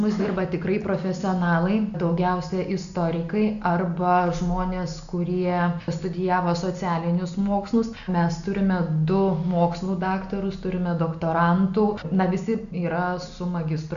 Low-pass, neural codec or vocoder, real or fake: 7.2 kHz; none; real